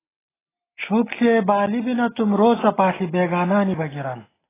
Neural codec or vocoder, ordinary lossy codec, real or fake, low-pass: none; AAC, 16 kbps; real; 3.6 kHz